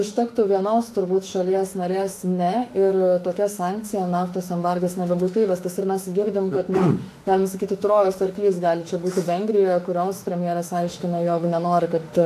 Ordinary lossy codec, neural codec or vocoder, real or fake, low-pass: AAC, 64 kbps; autoencoder, 48 kHz, 32 numbers a frame, DAC-VAE, trained on Japanese speech; fake; 14.4 kHz